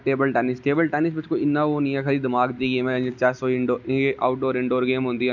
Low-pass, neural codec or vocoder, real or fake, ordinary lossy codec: 7.2 kHz; none; real; none